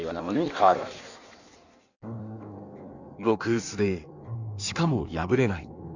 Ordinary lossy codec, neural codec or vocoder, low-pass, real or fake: none; codec, 16 kHz in and 24 kHz out, 1.1 kbps, FireRedTTS-2 codec; 7.2 kHz; fake